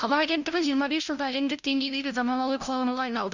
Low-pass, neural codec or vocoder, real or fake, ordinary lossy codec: 7.2 kHz; codec, 16 kHz, 0.5 kbps, FunCodec, trained on LibriTTS, 25 frames a second; fake; none